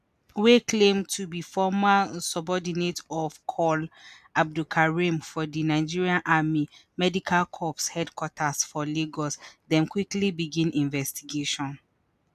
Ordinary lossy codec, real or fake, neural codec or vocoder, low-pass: none; real; none; 14.4 kHz